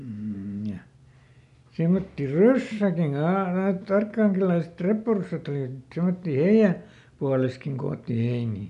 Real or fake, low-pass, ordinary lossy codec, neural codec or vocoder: real; 10.8 kHz; none; none